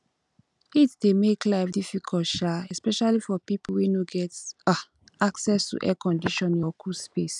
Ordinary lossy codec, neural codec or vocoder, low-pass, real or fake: none; none; 10.8 kHz; real